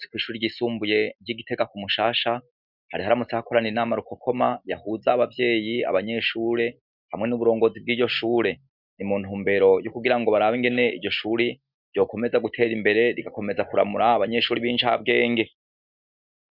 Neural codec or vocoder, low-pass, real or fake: none; 5.4 kHz; real